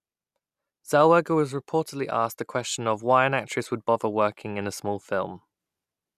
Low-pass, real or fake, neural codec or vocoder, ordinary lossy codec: 14.4 kHz; real; none; none